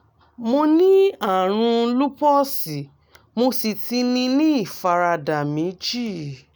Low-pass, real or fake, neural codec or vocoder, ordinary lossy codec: none; real; none; none